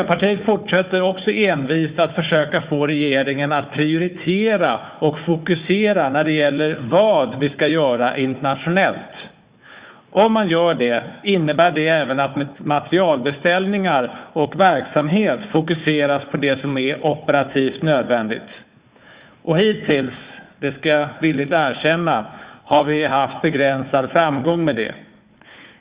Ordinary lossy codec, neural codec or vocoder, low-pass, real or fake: Opus, 64 kbps; codec, 16 kHz, 4 kbps, FunCodec, trained on Chinese and English, 50 frames a second; 3.6 kHz; fake